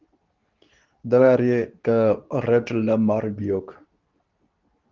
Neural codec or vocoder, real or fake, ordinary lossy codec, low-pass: codec, 24 kHz, 0.9 kbps, WavTokenizer, medium speech release version 2; fake; Opus, 32 kbps; 7.2 kHz